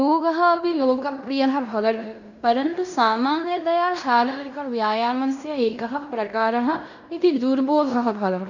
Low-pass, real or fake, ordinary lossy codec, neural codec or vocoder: 7.2 kHz; fake; none; codec, 16 kHz in and 24 kHz out, 0.9 kbps, LongCat-Audio-Codec, fine tuned four codebook decoder